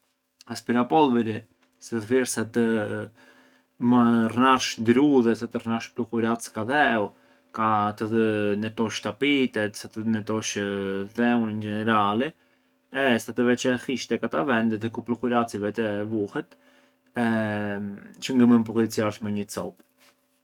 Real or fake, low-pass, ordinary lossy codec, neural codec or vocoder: fake; 19.8 kHz; none; codec, 44.1 kHz, 7.8 kbps, DAC